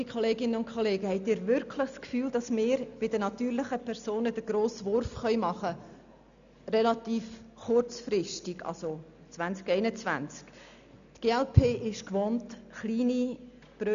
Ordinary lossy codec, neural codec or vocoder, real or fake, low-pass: none; none; real; 7.2 kHz